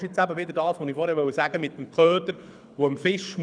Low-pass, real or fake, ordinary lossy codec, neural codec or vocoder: 9.9 kHz; fake; none; codec, 24 kHz, 6 kbps, HILCodec